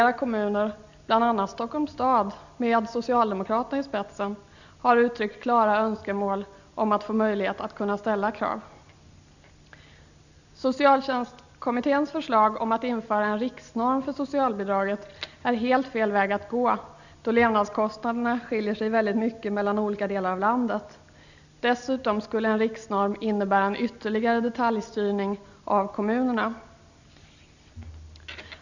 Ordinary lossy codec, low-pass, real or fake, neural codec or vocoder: none; 7.2 kHz; real; none